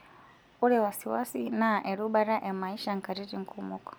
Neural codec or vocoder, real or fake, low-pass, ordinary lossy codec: none; real; none; none